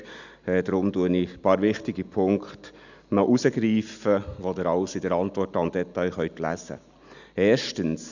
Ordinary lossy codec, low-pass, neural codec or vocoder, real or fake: none; 7.2 kHz; none; real